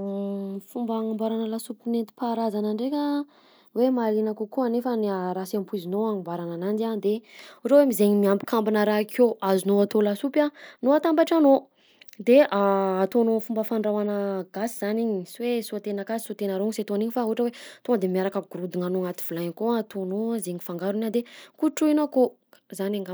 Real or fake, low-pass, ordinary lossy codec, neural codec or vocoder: real; none; none; none